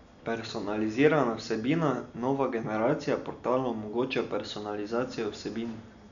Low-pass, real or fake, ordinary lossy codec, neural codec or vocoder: 7.2 kHz; real; none; none